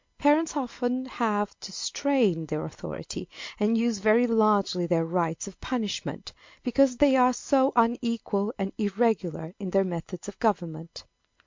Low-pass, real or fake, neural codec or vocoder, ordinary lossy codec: 7.2 kHz; real; none; MP3, 48 kbps